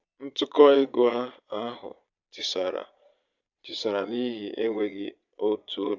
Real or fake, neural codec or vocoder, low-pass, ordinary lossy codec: fake; vocoder, 22.05 kHz, 80 mel bands, Vocos; 7.2 kHz; none